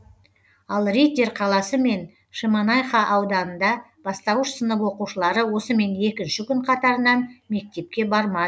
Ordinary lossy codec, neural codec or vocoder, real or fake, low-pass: none; none; real; none